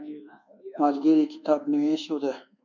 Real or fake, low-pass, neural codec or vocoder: fake; 7.2 kHz; codec, 24 kHz, 1.2 kbps, DualCodec